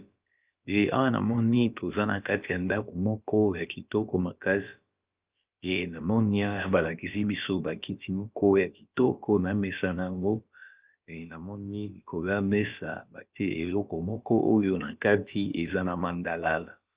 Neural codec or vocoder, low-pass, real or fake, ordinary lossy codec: codec, 16 kHz, about 1 kbps, DyCAST, with the encoder's durations; 3.6 kHz; fake; Opus, 32 kbps